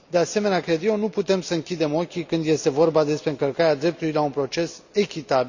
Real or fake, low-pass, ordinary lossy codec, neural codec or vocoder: real; 7.2 kHz; Opus, 64 kbps; none